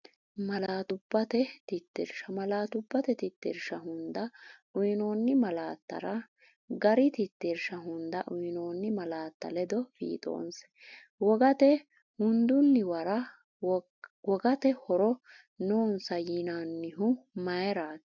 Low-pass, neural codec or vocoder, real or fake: 7.2 kHz; none; real